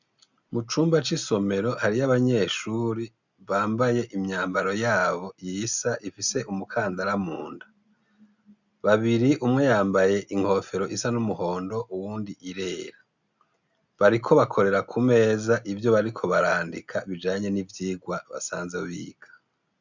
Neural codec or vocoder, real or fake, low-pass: none; real; 7.2 kHz